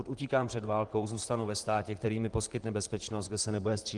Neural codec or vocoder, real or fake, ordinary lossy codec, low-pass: vocoder, 44.1 kHz, 128 mel bands, Pupu-Vocoder; fake; Opus, 24 kbps; 10.8 kHz